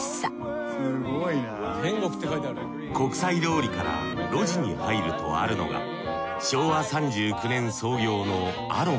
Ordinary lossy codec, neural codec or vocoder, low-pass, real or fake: none; none; none; real